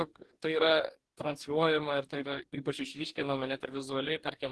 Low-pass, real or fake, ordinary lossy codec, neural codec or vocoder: 10.8 kHz; fake; Opus, 16 kbps; codec, 44.1 kHz, 2.6 kbps, DAC